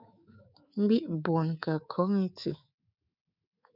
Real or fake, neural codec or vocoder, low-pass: fake; codec, 24 kHz, 3.1 kbps, DualCodec; 5.4 kHz